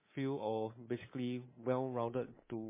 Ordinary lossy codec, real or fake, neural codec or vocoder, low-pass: MP3, 16 kbps; fake; codec, 24 kHz, 3.1 kbps, DualCodec; 3.6 kHz